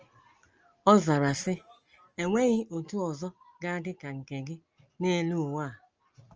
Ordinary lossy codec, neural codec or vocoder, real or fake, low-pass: Opus, 24 kbps; none; real; 7.2 kHz